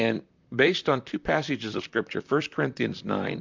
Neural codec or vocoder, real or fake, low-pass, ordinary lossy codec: vocoder, 44.1 kHz, 128 mel bands, Pupu-Vocoder; fake; 7.2 kHz; MP3, 64 kbps